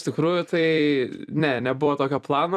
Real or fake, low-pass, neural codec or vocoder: fake; 14.4 kHz; vocoder, 44.1 kHz, 128 mel bands every 256 samples, BigVGAN v2